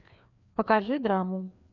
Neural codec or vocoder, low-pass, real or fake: codec, 16 kHz, 2 kbps, FreqCodec, larger model; 7.2 kHz; fake